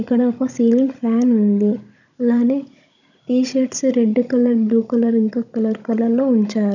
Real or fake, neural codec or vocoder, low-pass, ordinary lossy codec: fake; codec, 16 kHz, 16 kbps, FunCodec, trained on Chinese and English, 50 frames a second; 7.2 kHz; none